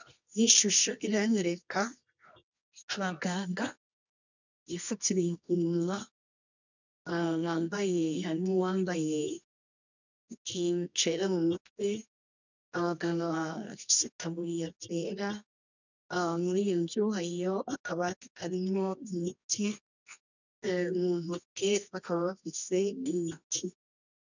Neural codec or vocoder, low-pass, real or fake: codec, 24 kHz, 0.9 kbps, WavTokenizer, medium music audio release; 7.2 kHz; fake